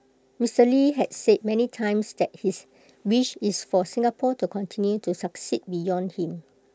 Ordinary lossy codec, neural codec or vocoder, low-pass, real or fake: none; none; none; real